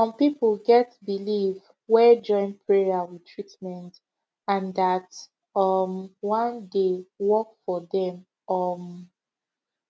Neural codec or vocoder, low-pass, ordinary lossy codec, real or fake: none; none; none; real